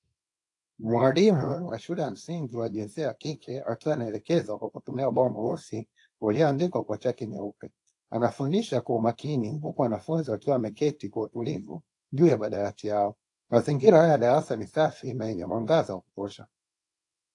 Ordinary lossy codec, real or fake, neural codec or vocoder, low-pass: AAC, 48 kbps; fake; codec, 24 kHz, 0.9 kbps, WavTokenizer, small release; 10.8 kHz